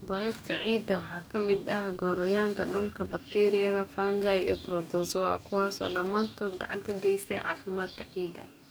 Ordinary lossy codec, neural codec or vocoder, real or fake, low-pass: none; codec, 44.1 kHz, 2.6 kbps, DAC; fake; none